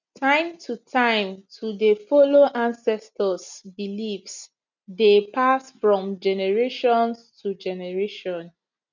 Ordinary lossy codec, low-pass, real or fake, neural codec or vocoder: none; 7.2 kHz; real; none